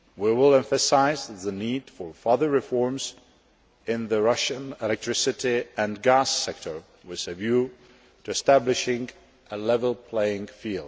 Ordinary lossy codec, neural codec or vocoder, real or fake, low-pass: none; none; real; none